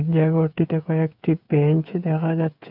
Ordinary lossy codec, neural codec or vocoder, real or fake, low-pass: none; codec, 16 kHz, 8 kbps, FreqCodec, smaller model; fake; 5.4 kHz